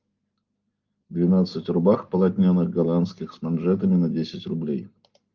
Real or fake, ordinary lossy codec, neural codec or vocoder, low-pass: real; Opus, 32 kbps; none; 7.2 kHz